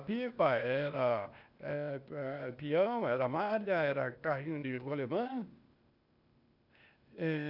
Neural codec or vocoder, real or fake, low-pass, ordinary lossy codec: codec, 16 kHz, 0.8 kbps, ZipCodec; fake; 5.4 kHz; none